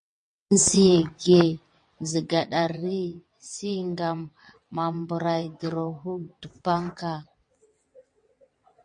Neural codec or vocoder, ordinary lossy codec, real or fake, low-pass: vocoder, 22.05 kHz, 80 mel bands, WaveNeXt; MP3, 48 kbps; fake; 9.9 kHz